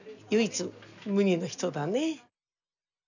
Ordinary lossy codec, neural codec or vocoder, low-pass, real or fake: none; none; 7.2 kHz; real